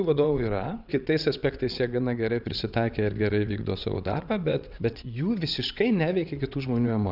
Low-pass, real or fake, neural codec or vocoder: 5.4 kHz; fake; vocoder, 22.05 kHz, 80 mel bands, WaveNeXt